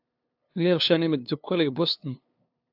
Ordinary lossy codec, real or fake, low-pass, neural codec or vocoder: AAC, 48 kbps; fake; 5.4 kHz; codec, 16 kHz, 2 kbps, FunCodec, trained on LibriTTS, 25 frames a second